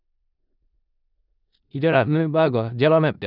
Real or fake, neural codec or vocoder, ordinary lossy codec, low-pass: fake; codec, 16 kHz in and 24 kHz out, 0.4 kbps, LongCat-Audio-Codec, four codebook decoder; none; 5.4 kHz